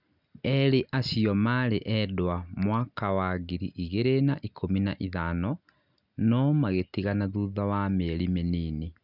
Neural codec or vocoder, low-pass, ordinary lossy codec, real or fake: none; 5.4 kHz; none; real